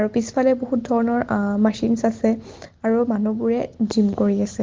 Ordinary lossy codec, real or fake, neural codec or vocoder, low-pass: Opus, 16 kbps; real; none; 7.2 kHz